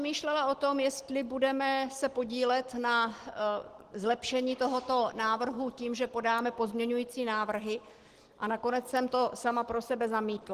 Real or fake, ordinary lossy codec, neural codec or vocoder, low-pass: real; Opus, 16 kbps; none; 14.4 kHz